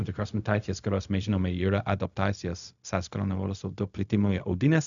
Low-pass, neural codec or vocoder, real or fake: 7.2 kHz; codec, 16 kHz, 0.4 kbps, LongCat-Audio-Codec; fake